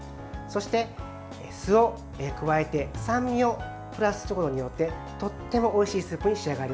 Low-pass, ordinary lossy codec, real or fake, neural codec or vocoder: none; none; real; none